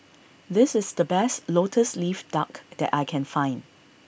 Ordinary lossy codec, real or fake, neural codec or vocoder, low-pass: none; real; none; none